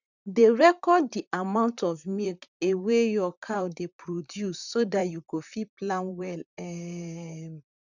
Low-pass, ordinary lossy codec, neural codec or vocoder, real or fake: 7.2 kHz; none; vocoder, 44.1 kHz, 128 mel bands, Pupu-Vocoder; fake